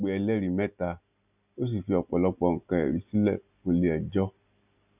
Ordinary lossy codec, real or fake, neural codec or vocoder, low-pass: none; real; none; 3.6 kHz